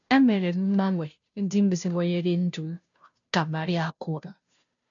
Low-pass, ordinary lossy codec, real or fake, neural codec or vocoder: 7.2 kHz; none; fake; codec, 16 kHz, 0.5 kbps, FunCodec, trained on Chinese and English, 25 frames a second